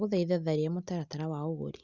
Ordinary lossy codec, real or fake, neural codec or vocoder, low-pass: Opus, 64 kbps; real; none; 7.2 kHz